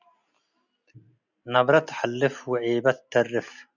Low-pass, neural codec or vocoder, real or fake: 7.2 kHz; none; real